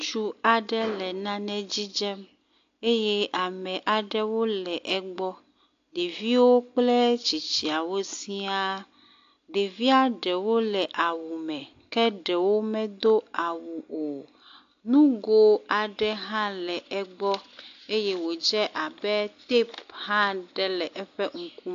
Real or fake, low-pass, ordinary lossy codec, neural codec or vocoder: real; 7.2 kHz; AAC, 48 kbps; none